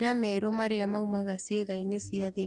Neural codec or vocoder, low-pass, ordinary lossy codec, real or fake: codec, 44.1 kHz, 2.6 kbps, DAC; 10.8 kHz; none; fake